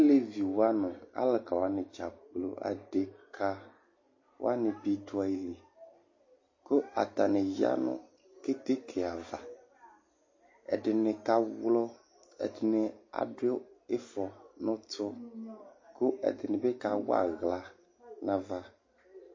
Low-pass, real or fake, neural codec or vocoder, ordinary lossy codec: 7.2 kHz; real; none; MP3, 32 kbps